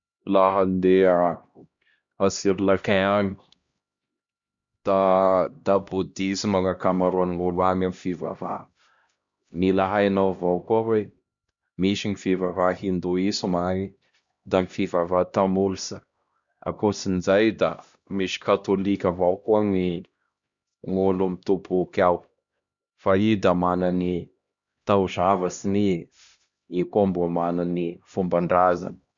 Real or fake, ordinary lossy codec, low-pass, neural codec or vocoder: fake; Opus, 64 kbps; 7.2 kHz; codec, 16 kHz, 1 kbps, X-Codec, HuBERT features, trained on LibriSpeech